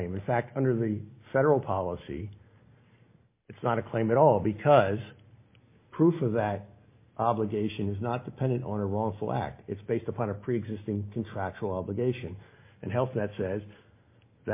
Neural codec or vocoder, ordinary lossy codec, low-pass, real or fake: none; MP3, 24 kbps; 3.6 kHz; real